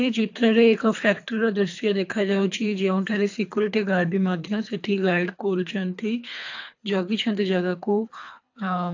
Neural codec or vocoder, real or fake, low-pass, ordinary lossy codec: codec, 24 kHz, 3 kbps, HILCodec; fake; 7.2 kHz; AAC, 48 kbps